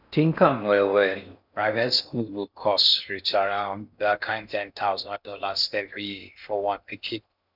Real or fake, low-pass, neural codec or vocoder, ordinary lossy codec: fake; 5.4 kHz; codec, 16 kHz in and 24 kHz out, 0.6 kbps, FocalCodec, streaming, 4096 codes; none